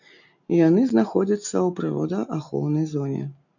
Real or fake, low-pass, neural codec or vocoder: real; 7.2 kHz; none